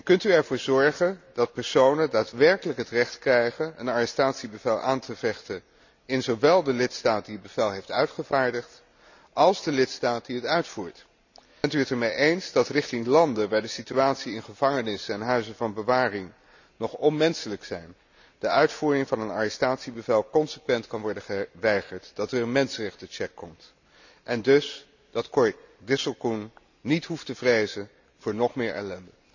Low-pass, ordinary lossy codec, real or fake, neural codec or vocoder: 7.2 kHz; none; real; none